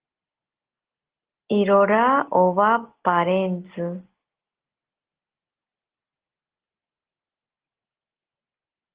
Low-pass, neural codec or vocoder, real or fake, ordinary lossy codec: 3.6 kHz; none; real; Opus, 16 kbps